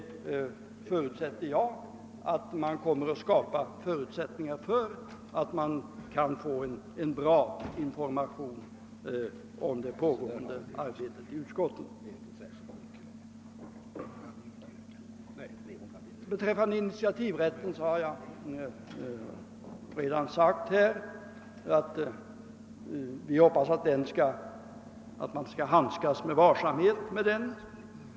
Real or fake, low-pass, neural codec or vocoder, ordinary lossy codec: real; none; none; none